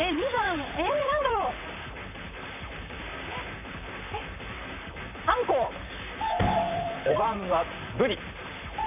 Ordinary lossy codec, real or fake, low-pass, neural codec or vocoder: none; fake; 3.6 kHz; vocoder, 44.1 kHz, 128 mel bands, Pupu-Vocoder